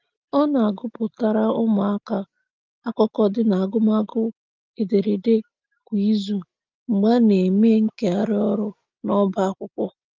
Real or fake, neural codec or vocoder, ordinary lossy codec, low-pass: real; none; Opus, 32 kbps; 7.2 kHz